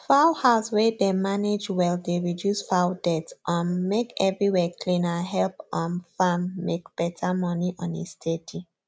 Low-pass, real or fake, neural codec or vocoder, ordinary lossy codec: none; real; none; none